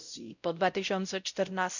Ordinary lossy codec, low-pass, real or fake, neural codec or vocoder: none; 7.2 kHz; fake; codec, 16 kHz, 0.5 kbps, X-Codec, WavLM features, trained on Multilingual LibriSpeech